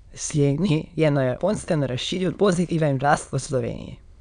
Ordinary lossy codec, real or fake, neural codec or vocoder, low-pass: none; fake; autoencoder, 22.05 kHz, a latent of 192 numbers a frame, VITS, trained on many speakers; 9.9 kHz